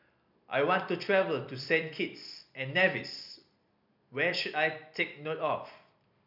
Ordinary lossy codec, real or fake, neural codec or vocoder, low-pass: none; fake; vocoder, 44.1 kHz, 128 mel bands every 256 samples, BigVGAN v2; 5.4 kHz